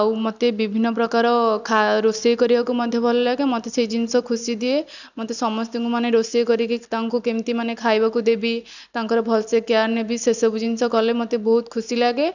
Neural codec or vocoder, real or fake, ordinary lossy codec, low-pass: none; real; none; 7.2 kHz